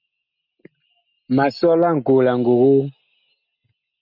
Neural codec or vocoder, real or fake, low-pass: none; real; 5.4 kHz